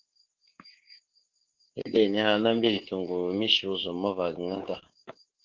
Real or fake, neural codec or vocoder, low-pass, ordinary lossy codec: fake; codec, 24 kHz, 3.1 kbps, DualCodec; 7.2 kHz; Opus, 16 kbps